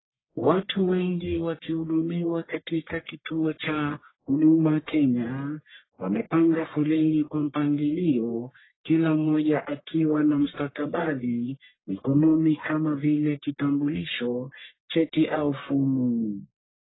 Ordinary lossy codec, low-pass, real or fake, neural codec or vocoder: AAC, 16 kbps; 7.2 kHz; fake; codec, 44.1 kHz, 1.7 kbps, Pupu-Codec